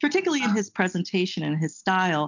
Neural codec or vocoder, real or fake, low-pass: none; real; 7.2 kHz